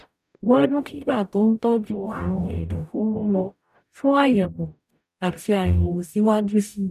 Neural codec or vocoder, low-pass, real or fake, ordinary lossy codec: codec, 44.1 kHz, 0.9 kbps, DAC; 14.4 kHz; fake; none